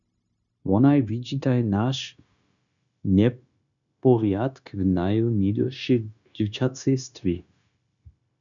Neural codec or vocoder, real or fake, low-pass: codec, 16 kHz, 0.9 kbps, LongCat-Audio-Codec; fake; 7.2 kHz